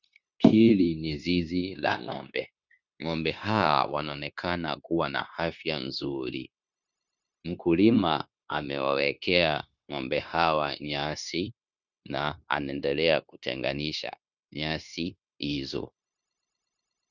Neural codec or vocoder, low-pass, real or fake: codec, 16 kHz, 0.9 kbps, LongCat-Audio-Codec; 7.2 kHz; fake